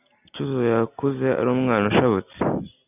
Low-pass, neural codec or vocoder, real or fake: 3.6 kHz; none; real